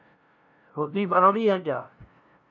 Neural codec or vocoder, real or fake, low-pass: codec, 16 kHz, 0.5 kbps, FunCodec, trained on LibriTTS, 25 frames a second; fake; 7.2 kHz